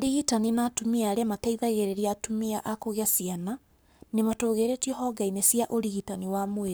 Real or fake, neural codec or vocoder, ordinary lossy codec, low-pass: fake; codec, 44.1 kHz, 7.8 kbps, Pupu-Codec; none; none